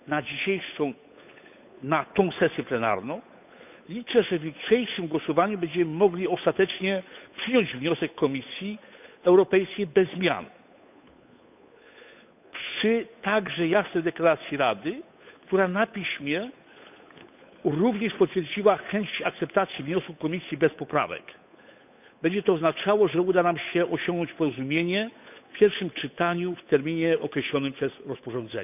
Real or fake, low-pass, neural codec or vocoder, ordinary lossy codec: fake; 3.6 kHz; codec, 16 kHz, 8 kbps, FunCodec, trained on Chinese and English, 25 frames a second; none